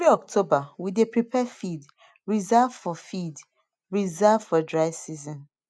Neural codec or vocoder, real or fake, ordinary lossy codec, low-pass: none; real; none; none